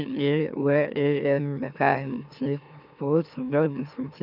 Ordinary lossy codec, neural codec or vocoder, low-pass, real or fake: none; autoencoder, 44.1 kHz, a latent of 192 numbers a frame, MeloTTS; 5.4 kHz; fake